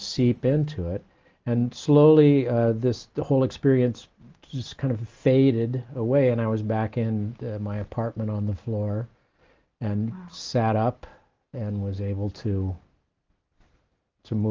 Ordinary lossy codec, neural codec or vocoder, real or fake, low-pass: Opus, 24 kbps; none; real; 7.2 kHz